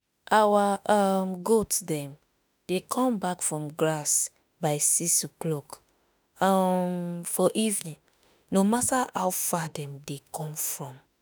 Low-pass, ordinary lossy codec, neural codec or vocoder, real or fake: none; none; autoencoder, 48 kHz, 32 numbers a frame, DAC-VAE, trained on Japanese speech; fake